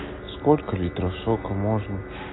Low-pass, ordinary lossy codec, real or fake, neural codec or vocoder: 7.2 kHz; AAC, 16 kbps; real; none